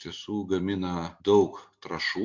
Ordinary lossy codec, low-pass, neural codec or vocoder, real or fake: MP3, 48 kbps; 7.2 kHz; none; real